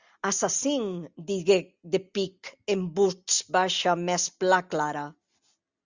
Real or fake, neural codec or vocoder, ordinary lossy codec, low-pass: real; none; Opus, 64 kbps; 7.2 kHz